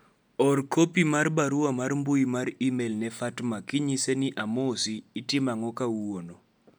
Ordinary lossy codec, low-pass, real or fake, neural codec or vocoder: none; 19.8 kHz; real; none